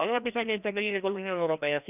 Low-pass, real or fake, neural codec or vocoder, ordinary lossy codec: 3.6 kHz; fake; codec, 16 kHz, 1 kbps, FreqCodec, larger model; none